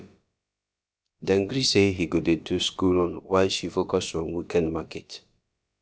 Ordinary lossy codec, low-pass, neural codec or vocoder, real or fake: none; none; codec, 16 kHz, about 1 kbps, DyCAST, with the encoder's durations; fake